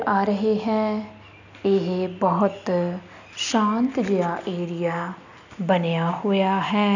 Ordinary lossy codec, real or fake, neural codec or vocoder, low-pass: none; real; none; 7.2 kHz